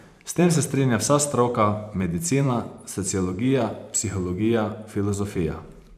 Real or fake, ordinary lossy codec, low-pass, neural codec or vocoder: fake; none; 14.4 kHz; vocoder, 44.1 kHz, 128 mel bands every 512 samples, BigVGAN v2